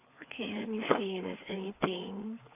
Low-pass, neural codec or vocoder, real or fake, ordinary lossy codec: 3.6 kHz; codec, 16 kHz, 4 kbps, FreqCodec, larger model; fake; AAC, 24 kbps